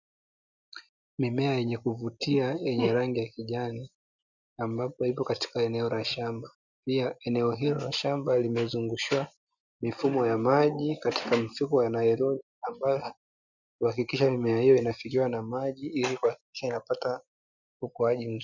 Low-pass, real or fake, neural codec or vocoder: 7.2 kHz; real; none